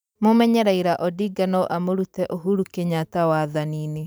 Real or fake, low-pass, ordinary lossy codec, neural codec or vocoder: fake; none; none; vocoder, 44.1 kHz, 128 mel bands every 512 samples, BigVGAN v2